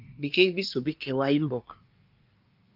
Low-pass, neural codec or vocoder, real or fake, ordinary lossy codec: 5.4 kHz; codec, 24 kHz, 1 kbps, SNAC; fake; Opus, 24 kbps